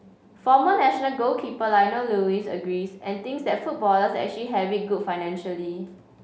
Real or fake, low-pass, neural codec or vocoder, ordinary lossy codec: real; none; none; none